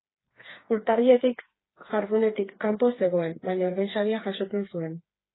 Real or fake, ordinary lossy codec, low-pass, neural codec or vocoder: fake; AAC, 16 kbps; 7.2 kHz; codec, 16 kHz, 4 kbps, FreqCodec, smaller model